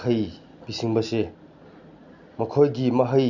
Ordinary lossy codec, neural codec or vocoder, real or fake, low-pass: none; none; real; 7.2 kHz